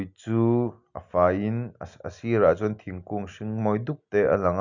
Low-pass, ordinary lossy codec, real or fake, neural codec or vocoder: 7.2 kHz; none; real; none